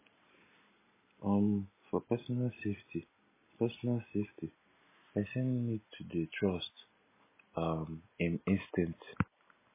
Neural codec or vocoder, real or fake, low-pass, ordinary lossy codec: none; real; 3.6 kHz; MP3, 16 kbps